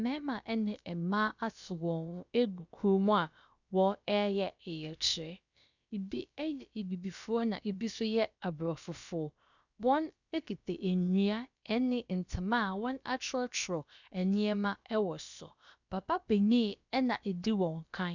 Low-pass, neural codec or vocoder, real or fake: 7.2 kHz; codec, 16 kHz, about 1 kbps, DyCAST, with the encoder's durations; fake